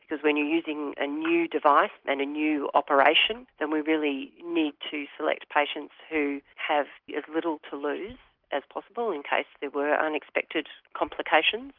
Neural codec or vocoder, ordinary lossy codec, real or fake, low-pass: none; Opus, 32 kbps; real; 5.4 kHz